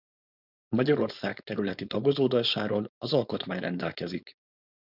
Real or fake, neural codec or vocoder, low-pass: fake; codec, 16 kHz, 4.8 kbps, FACodec; 5.4 kHz